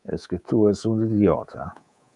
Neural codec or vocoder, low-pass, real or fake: codec, 24 kHz, 3.1 kbps, DualCodec; 10.8 kHz; fake